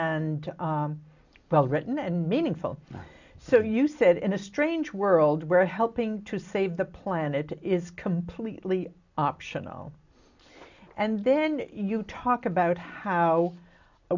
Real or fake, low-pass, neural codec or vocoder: real; 7.2 kHz; none